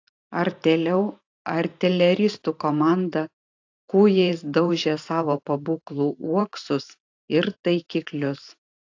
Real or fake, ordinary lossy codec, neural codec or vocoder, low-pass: fake; AAC, 48 kbps; vocoder, 44.1 kHz, 128 mel bands every 512 samples, BigVGAN v2; 7.2 kHz